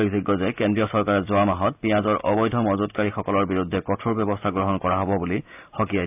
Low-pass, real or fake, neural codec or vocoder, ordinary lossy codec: 3.6 kHz; real; none; AAC, 32 kbps